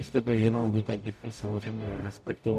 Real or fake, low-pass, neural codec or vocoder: fake; 14.4 kHz; codec, 44.1 kHz, 0.9 kbps, DAC